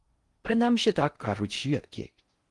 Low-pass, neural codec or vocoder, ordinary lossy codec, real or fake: 10.8 kHz; codec, 16 kHz in and 24 kHz out, 0.6 kbps, FocalCodec, streaming, 4096 codes; Opus, 24 kbps; fake